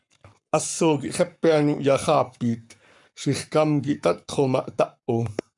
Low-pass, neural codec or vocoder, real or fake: 10.8 kHz; codec, 44.1 kHz, 7.8 kbps, Pupu-Codec; fake